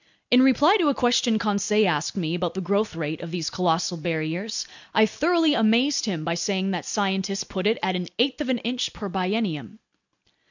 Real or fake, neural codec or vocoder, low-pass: real; none; 7.2 kHz